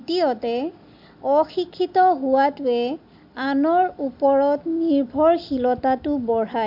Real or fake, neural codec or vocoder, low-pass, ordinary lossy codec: real; none; 5.4 kHz; MP3, 48 kbps